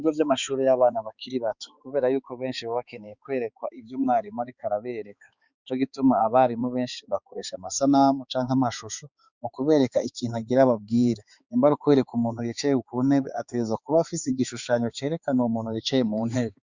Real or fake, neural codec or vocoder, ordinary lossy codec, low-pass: fake; codec, 16 kHz, 4 kbps, X-Codec, HuBERT features, trained on balanced general audio; Opus, 64 kbps; 7.2 kHz